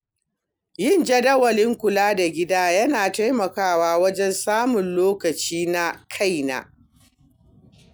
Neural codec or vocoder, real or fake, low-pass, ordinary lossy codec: none; real; none; none